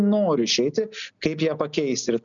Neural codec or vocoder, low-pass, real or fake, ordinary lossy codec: none; 7.2 kHz; real; MP3, 96 kbps